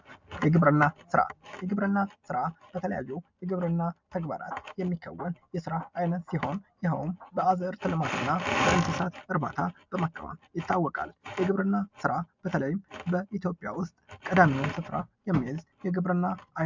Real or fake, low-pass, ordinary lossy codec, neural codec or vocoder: real; 7.2 kHz; MP3, 64 kbps; none